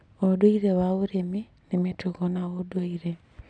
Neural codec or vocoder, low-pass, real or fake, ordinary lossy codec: none; 9.9 kHz; real; none